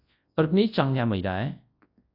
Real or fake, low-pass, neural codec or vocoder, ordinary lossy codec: fake; 5.4 kHz; codec, 24 kHz, 0.9 kbps, WavTokenizer, large speech release; AAC, 32 kbps